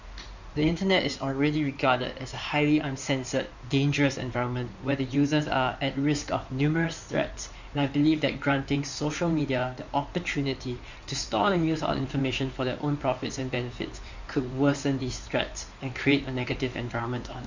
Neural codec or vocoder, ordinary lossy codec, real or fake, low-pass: codec, 16 kHz in and 24 kHz out, 2.2 kbps, FireRedTTS-2 codec; none; fake; 7.2 kHz